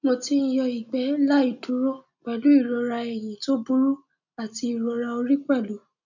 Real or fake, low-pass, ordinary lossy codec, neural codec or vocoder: real; 7.2 kHz; none; none